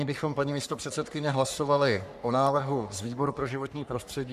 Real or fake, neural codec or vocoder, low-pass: fake; codec, 44.1 kHz, 3.4 kbps, Pupu-Codec; 14.4 kHz